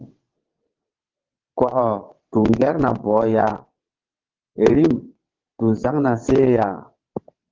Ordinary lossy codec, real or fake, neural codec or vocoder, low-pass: Opus, 16 kbps; fake; vocoder, 22.05 kHz, 80 mel bands, WaveNeXt; 7.2 kHz